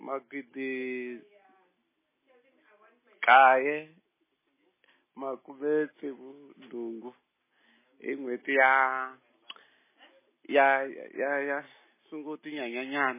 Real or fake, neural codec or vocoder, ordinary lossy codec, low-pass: real; none; MP3, 16 kbps; 3.6 kHz